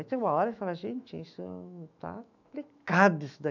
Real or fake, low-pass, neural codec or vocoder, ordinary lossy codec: fake; 7.2 kHz; autoencoder, 48 kHz, 128 numbers a frame, DAC-VAE, trained on Japanese speech; none